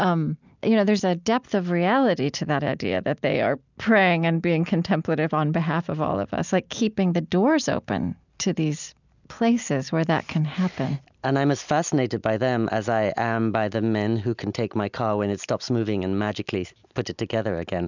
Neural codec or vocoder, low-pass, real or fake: none; 7.2 kHz; real